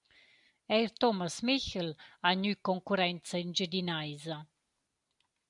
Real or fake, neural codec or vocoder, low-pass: real; none; 10.8 kHz